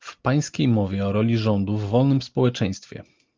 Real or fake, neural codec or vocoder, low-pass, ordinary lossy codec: real; none; 7.2 kHz; Opus, 32 kbps